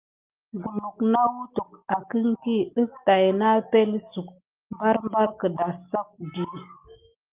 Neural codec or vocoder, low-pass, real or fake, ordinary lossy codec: none; 3.6 kHz; real; Opus, 24 kbps